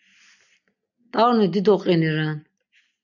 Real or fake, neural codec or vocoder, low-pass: real; none; 7.2 kHz